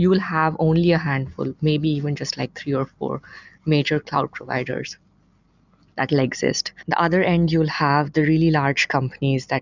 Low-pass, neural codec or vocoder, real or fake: 7.2 kHz; none; real